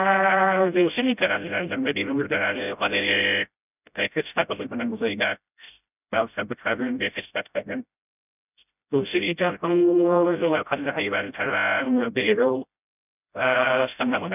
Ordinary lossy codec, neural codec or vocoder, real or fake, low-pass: none; codec, 16 kHz, 0.5 kbps, FreqCodec, smaller model; fake; 3.6 kHz